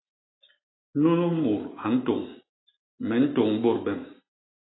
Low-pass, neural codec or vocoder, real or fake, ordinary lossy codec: 7.2 kHz; none; real; AAC, 16 kbps